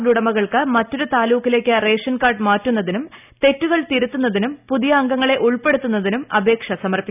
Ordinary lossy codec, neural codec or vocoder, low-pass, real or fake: none; none; 3.6 kHz; real